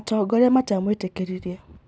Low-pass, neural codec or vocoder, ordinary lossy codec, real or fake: none; none; none; real